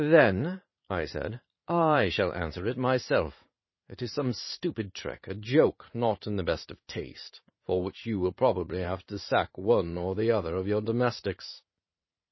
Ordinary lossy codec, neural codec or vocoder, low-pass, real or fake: MP3, 24 kbps; vocoder, 44.1 kHz, 80 mel bands, Vocos; 7.2 kHz; fake